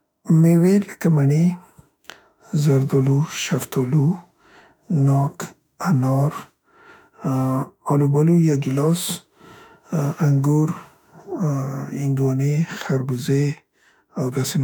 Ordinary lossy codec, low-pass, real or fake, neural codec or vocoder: none; 19.8 kHz; fake; autoencoder, 48 kHz, 32 numbers a frame, DAC-VAE, trained on Japanese speech